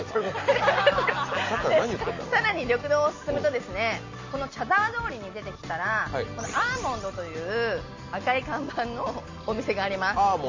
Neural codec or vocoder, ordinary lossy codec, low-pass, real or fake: none; MP3, 32 kbps; 7.2 kHz; real